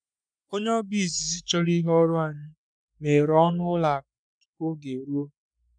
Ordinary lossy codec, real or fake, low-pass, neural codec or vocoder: none; fake; 9.9 kHz; codec, 44.1 kHz, 3.4 kbps, Pupu-Codec